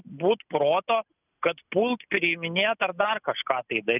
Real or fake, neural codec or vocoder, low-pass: real; none; 3.6 kHz